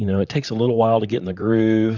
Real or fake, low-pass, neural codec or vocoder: fake; 7.2 kHz; vocoder, 44.1 kHz, 128 mel bands, Pupu-Vocoder